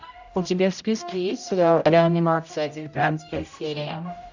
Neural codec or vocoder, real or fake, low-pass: codec, 16 kHz, 0.5 kbps, X-Codec, HuBERT features, trained on general audio; fake; 7.2 kHz